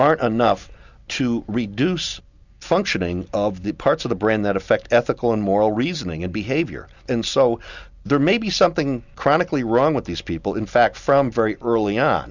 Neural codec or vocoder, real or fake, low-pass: none; real; 7.2 kHz